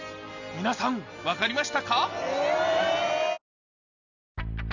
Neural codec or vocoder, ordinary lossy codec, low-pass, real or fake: none; none; 7.2 kHz; real